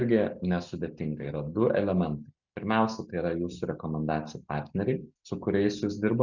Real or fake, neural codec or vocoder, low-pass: real; none; 7.2 kHz